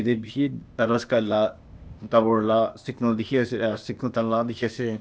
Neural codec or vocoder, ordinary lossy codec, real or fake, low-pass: codec, 16 kHz, 0.8 kbps, ZipCodec; none; fake; none